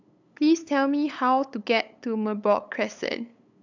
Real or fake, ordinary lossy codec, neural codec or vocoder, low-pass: fake; none; codec, 16 kHz, 8 kbps, FunCodec, trained on LibriTTS, 25 frames a second; 7.2 kHz